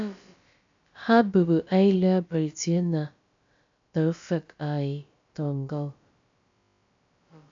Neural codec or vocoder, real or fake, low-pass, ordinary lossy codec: codec, 16 kHz, about 1 kbps, DyCAST, with the encoder's durations; fake; 7.2 kHz; AAC, 64 kbps